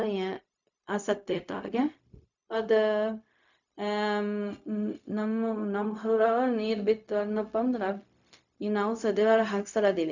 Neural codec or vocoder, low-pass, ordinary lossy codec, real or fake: codec, 16 kHz, 0.4 kbps, LongCat-Audio-Codec; 7.2 kHz; none; fake